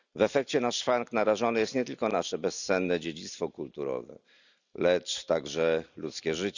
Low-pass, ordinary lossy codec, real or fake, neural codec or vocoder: 7.2 kHz; none; real; none